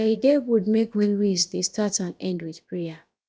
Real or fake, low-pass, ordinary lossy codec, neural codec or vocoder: fake; none; none; codec, 16 kHz, about 1 kbps, DyCAST, with the encoder's durations